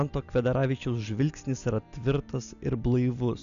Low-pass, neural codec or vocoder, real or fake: 7.2 kHz; none; real